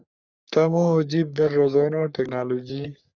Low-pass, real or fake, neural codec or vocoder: 7.2 kHz; fake; codec, 44.1 kHz, 3.4 kbps, Pupu-Codec